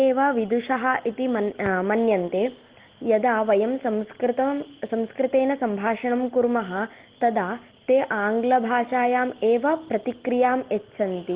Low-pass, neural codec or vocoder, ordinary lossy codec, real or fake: 3.6 kHz; none; Opus, 16 kbps; real